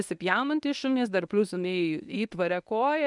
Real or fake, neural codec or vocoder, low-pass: fake; codec, 24 kHz, 0.9 kbps, WavTokenizer, medium speech release version 1; 10.8 kHz